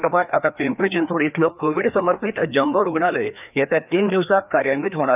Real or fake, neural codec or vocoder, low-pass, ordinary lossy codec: fake; codec, 16 kHz, 2 kbps, FreqCodec, larger model; 3.6 kHz; none